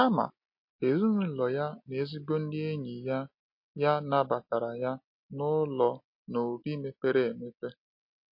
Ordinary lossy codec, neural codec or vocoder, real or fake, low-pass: MP3, 32 kbps; none; real; 5.4 kHz